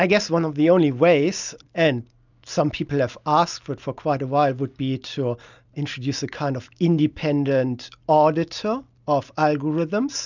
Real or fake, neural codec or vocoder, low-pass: real; none; 7.2 kHz